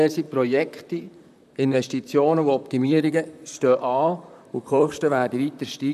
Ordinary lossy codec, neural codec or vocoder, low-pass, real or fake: none; vocoder, 44.1 kHz, 128 mel bands, Pupu-Vocoder; 14.4 kHz; fake